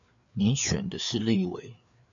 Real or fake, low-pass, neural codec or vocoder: fake; 7.2 kHz; codec, 16 kHz, 4 kbps, FreqCodec, larger model